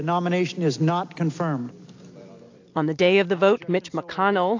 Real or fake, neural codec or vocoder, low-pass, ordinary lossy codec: real; none; 7.2 kHz; AAC, 48 kbps